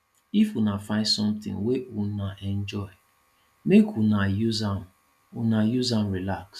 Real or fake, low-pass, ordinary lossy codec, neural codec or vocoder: real; 14.4 kHz; none; none